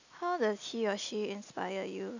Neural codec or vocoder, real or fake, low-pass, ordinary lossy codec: none; real; 7.2 kHz; none